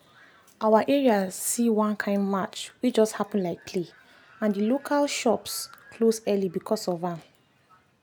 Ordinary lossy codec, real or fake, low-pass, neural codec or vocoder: none; real; none; none